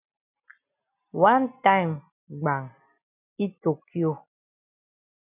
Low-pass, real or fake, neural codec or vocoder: 3.6 kHz; real; none